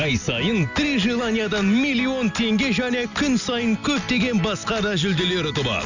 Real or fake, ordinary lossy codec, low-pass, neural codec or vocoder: real; none; 7.2 kHz; none